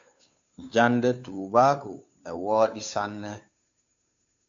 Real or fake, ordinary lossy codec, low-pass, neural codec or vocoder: fake; AAC, 64 kbps; 7.2 kHz; codec, 16 kHz, 2 kbps, FunCodec, trained on Chinese and English, 25 frames a second